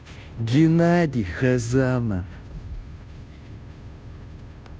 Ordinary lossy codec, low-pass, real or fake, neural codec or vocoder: none; none; fake; codec, 16 kHz, 0.5 kbps, FunCodec, trained on Chinese and English, 25 frames a second